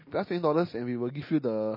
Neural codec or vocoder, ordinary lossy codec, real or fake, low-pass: none; MP3, 24 kbps; real; 5.4 kHz